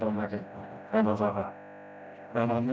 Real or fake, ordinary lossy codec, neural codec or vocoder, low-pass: fake; none; codec, 16 kHz, 0.5 kbps, FreqCodec, smaller model; none